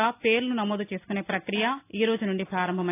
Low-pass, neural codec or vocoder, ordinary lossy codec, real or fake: 3.6 kHz; none; AAC, 24 kbps; real